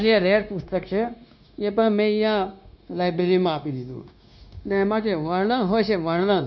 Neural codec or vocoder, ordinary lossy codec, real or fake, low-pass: codec, 16 kHz, 0.9 kbps, LongCat-Audio-Codec; MP3, 64 kbps; fake; 7.2 kHz